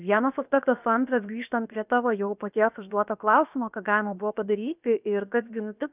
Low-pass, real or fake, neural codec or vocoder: 3.6 kHz; fake; codec, 16 kHz, 0.7 kbps, FocalCodec